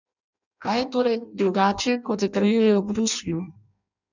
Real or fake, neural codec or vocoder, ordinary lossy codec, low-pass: fake; codec, 16 kHz in and 24 kHz out, 0.6 kbps, FireRedTTS-2 codec; none; 7.2 kHz